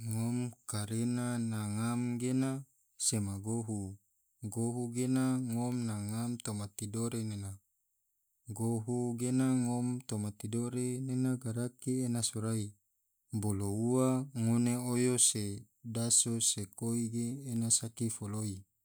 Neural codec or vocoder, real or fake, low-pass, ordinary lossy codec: none; real; none; none